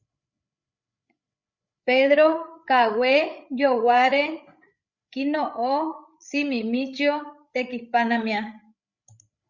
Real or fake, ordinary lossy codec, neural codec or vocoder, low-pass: fake; Opus, 64 kbps; codec, 16 kHz, 8 kbps, FreqCodec, larger model; 7.2 kHz